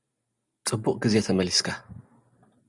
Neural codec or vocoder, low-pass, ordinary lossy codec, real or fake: none; 10.8 kHz; Opus, 64 kbps; real